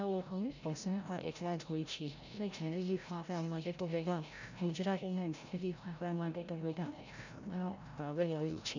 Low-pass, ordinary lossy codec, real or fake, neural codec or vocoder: 7.2 kHz; none; fake; codec, 16 kHz, 0.5 kbps, FreqCodec, larger model